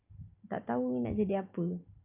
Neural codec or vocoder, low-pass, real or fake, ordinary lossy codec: none; 3.6 kHz; real; none